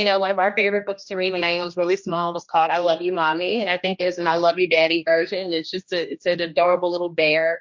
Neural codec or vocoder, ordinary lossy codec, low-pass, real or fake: codec, 16 kHz, 1 kbps, X-Codec, HuBERT features, trained on general audio; MP3, 48 kbps; 7.2 kHz; fake